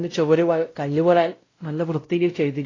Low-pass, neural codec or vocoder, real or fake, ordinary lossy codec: 7.2 kHz; codec, 16 kHz, 0.5 kbps, X-Codec, WavLM features, trained on Multilingual LibriSpeech; fake; AAC, 32 kbps